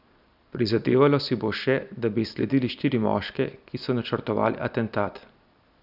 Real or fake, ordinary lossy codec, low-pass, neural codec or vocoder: real; none; 5.4 kHz; none